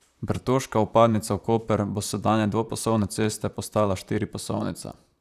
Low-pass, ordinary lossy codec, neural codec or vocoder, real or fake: 14.4 kHz; none; vocoder, 44.1 kHz, 128 mel bands, Pupu-Vocoder; fake